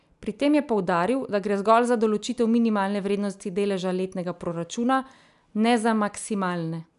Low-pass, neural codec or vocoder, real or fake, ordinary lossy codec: 10.8 kHz; none; real; none